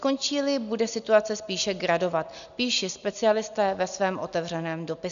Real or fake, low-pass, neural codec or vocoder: real; 7.2 kHz; none